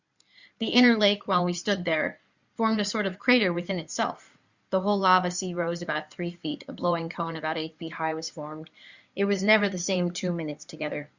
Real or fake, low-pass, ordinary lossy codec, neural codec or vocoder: fake; 7.2 kHz; Opus, 64 kbps; codec, 16 kHz in and 24 kHz out, 2.2 kbps, FireRedTTS-2 codec